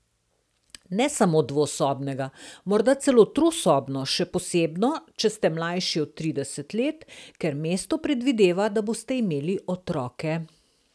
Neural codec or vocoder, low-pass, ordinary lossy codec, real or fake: none; none; none; real